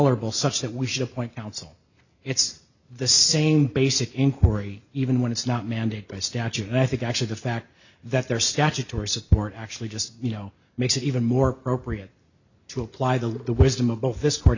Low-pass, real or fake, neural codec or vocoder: 7.2 kHz; real; none